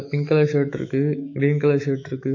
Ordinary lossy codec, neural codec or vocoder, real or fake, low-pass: AAC, 48 kbps; autoencoder, 48 kHz, 128 numbers a frame, DAC-VAE, trained on Japanese speech; fake; 7.2 kHz